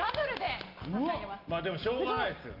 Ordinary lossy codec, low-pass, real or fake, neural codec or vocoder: Opus, 32 kbps; 5.4 kHz; real; none